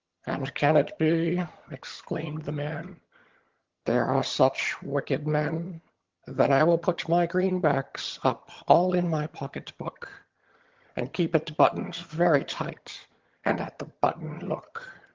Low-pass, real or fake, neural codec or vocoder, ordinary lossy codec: 7.2 kHz; fake; vocoder, 22.05 kHz, 80 mel bands, HiFi-GAN; Opus, 16 kbps